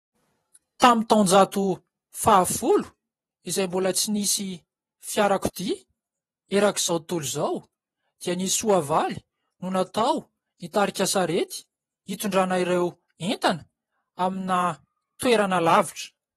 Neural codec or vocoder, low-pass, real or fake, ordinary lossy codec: vocoder, 48 kHz, 128 mel bands, Vocos; 19.8 kHz; fake; AAC, 32 kbps